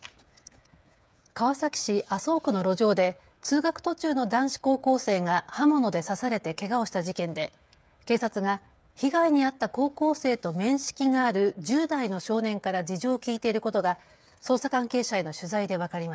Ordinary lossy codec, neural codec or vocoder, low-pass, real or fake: none; codec, 16 kHz, 8 kbps, FreqCodec, smaller model; none; fake